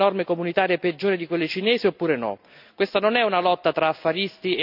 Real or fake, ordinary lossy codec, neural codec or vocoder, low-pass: real; none; none; 5.4 kHz